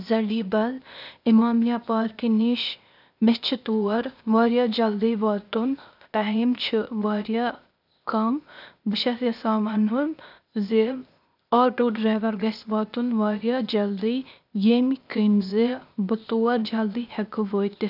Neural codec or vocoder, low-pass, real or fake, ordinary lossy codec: codec, 16 kHz, 0.8 kbps, ZipCodec; 5.4 kHz; fake; none